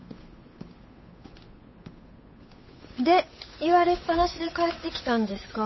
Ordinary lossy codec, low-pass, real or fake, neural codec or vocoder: MP3, 24 kbps; 7.2 kHz; fake; codec, 16 kHz, 8 kbps, FunCodec, trained on Chinese and English, 25 frames a second